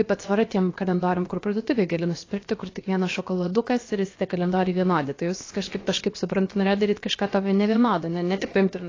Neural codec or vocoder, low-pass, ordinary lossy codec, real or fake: codec, 16 kHz, about 1 kbps, DyCAST, with the encoder's durations; 7.2 kHz; AAC, 32 kbps; fake